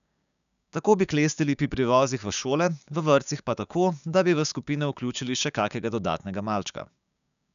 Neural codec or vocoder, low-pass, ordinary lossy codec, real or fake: codec, 16 kHz, 6 kbps, DAC; 7.2 kHz; none; fake